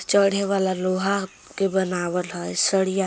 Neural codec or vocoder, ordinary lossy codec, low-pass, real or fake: none; none; none; real